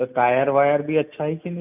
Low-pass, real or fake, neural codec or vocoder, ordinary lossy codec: 3.6 kHz; real; none; none